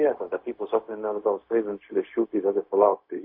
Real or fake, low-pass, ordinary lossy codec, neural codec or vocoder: fake; 5.4 kHz; MP3, 32 kbps; codec, 16 kHz, 0.4 kbps, LongCat-Audio-Codec